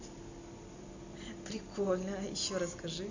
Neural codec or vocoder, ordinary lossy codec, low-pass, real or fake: none; AAC, 48 kbps; 7.2 kHz; real